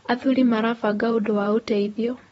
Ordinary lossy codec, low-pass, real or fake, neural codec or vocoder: AAC, 24 kbps; 19.8 kHz; fake; vocoder, 44.1 kHz, 128 mel bands, Pupu-Vocoder